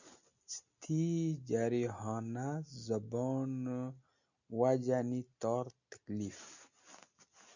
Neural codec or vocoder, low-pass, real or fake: none; 7.2 kHz; real